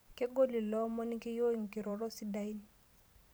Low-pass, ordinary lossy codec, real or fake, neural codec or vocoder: none; none; fake; vocoder, 44.1 kHz, 128 mel bands every 512 samples, BigVGAN v2